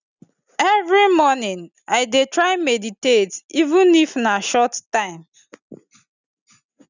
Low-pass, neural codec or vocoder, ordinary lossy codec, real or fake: 7.2 kHz; none; none; real